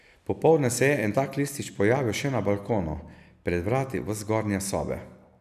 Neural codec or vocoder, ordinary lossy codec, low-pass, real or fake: none; none; 14.4 kHz; real